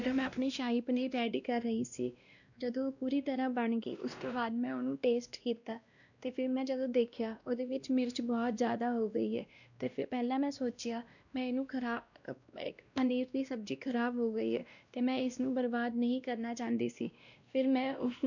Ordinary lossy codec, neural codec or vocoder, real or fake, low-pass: none; codec, 16 kHz, 1 kbps, X-Codec, WavLM features, trained on Multilingual LibriSpeech; fake; 7.2 kHz